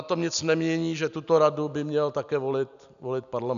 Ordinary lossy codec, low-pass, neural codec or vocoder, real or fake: MP3, 96 kbps; 7.2 kHz; none; real